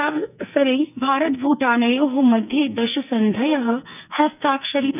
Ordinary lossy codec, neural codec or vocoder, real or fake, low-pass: none; codec, 44.1 kHz, 2.6 kbps, SNAC; fake; 3.6 kHz